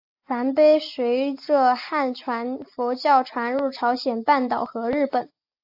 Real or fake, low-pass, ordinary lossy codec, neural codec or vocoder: real; 5.4 kHz; AAC, 48 kbps; none